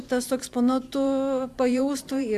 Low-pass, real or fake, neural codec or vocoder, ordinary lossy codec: 14.4 kHz; fake; vocoder, 44.1 kHz, 128 mel bands every 512 samples, BigVGAN v2; AAC, 96 kbps